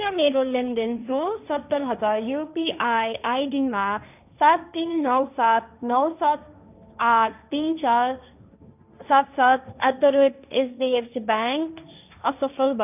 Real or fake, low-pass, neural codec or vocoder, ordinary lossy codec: fake; 3.6 kHz; codec, 16 kHz, 1.1 kbps, Voila-Tokenizer; none